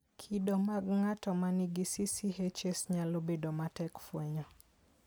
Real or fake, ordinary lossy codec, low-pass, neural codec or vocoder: real; none; none; none